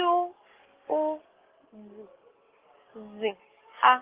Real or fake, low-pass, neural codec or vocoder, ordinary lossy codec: fake; 3.6 kHz; codec, 44.1 kHz, 7.8 kbps, DAC; Opus, 16 kbps